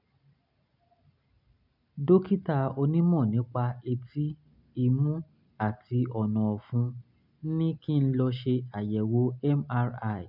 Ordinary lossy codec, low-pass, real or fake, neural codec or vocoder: none; 5.4 kHz; real; none